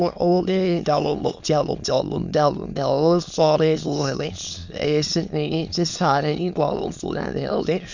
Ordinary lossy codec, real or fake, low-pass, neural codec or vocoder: Opus, 64 kbps; fake; 7.2 kHz; autoencoder, 22.05 kHz, a latent of 192 numbers a frame, VITS, trained on many speakers